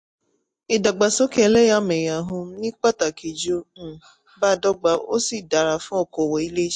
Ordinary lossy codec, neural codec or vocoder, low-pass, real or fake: MP3, 48 kbps; none; 9.9 kHz; real